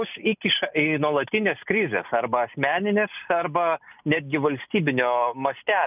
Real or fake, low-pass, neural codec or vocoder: real; 3.6 kHz; none